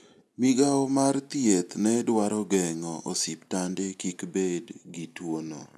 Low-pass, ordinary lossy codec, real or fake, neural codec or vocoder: none; none; real; none